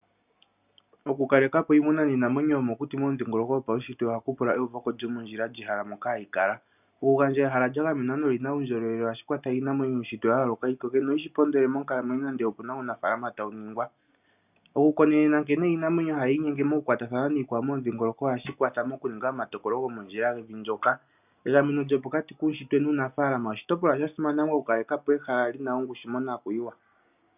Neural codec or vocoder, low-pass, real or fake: none; 3.6 kHz; real